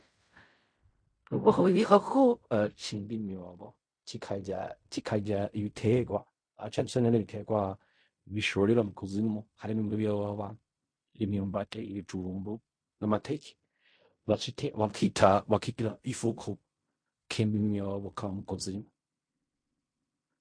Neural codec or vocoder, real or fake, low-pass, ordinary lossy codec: codec, 16 kHz in and 24 kHz out, 0.4 kbps, LongCat-Audio-Codec, fine tuned four codebook decoder; fake; 9.9 kHz; MP3, 48 kbps